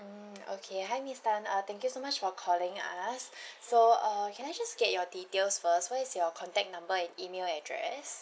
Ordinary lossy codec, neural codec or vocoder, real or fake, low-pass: none; none; real; none